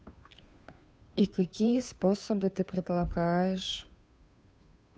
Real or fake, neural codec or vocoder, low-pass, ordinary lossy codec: fake; codec, 16 kHz, 2 kbps, FunCodec, trained on Chinese and English, 25 frames a second; none; none